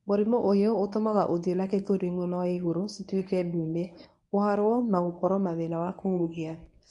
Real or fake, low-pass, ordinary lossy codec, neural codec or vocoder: fake; 10.8 kHz; none; codec, 24 kHz, 0.9 kbps, WavTokenizer, medium speech release version 1